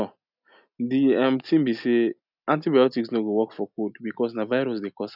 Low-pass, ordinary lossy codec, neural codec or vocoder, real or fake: 5.4 kHz; none; none; real